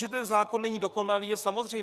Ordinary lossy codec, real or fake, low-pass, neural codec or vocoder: Opus, 64 kbps; fake; 14.4 kHz; codec, 44.1 kHz, 2.6 kbps, SNAC